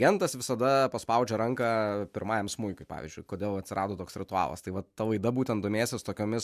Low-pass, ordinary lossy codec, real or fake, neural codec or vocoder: 14.4 kHz; MP3, 96 kbps; real; none